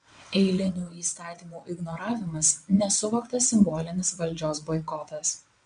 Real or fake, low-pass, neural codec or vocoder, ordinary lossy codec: fake; 9.9 kHz; vocoder, 22.05 kHz, 80 mel bands, WaveNeXt; MP3, 64 kbps